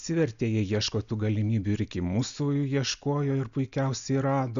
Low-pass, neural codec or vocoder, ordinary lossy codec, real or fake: 7.2 kHz; none; MP3, 96 kbps; real